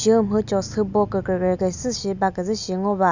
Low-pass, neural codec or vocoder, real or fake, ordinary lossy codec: 7.2 kHz; none; real; none